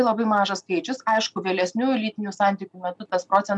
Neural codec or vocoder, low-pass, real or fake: none; 10.8 kHz; real